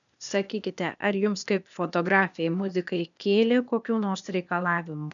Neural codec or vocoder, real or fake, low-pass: codec, 16 kHz, 0.8 kbps, ZipCodec; fake; 7.2 kHz